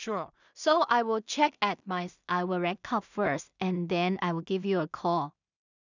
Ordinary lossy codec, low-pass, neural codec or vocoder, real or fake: none; 7.2 kHz; codec, 16 kHz in and 24 kHz out, 0.4 kbps, LongCat-Audio-Codec, two codebook decoder; fake